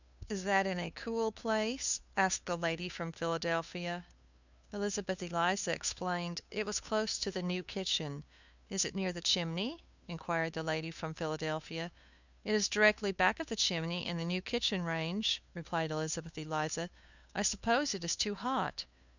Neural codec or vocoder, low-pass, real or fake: codec, 16 kHz, 2 kbps, FunCodec, trained on Chinese and English, 25 frames a second; 7.2 kHz; fake